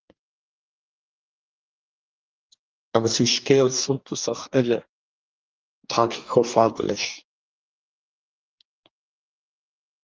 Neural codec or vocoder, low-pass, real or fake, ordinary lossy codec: codec, 44.1 kHz, 2.6 kbps, DAC; 7.2 kHz; fake; Opus, 32 kbps